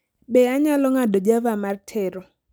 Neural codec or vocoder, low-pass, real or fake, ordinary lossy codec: none; none; real; none